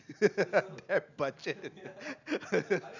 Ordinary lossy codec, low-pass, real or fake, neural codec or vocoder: none; 7.2 kHz; real; none